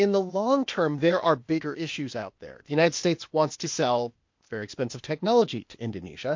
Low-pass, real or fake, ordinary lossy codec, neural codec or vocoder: 7.2 kHz; fake; MP3, 48 kbps; codec, 16 kHz, 0.8 kbps, ZipCodec